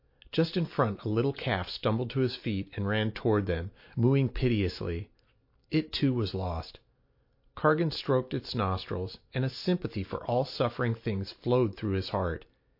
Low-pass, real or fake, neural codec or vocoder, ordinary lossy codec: 5.4 kHz; real; none; MP3, 32 kbps